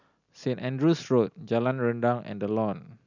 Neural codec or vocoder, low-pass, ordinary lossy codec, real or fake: none; 7.2 kHz; none; real